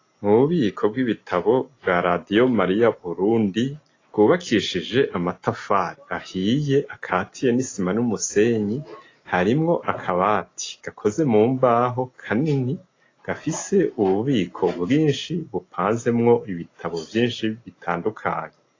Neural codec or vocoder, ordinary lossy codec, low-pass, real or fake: none; AAC, 32 kbps; 7.2 kHz; real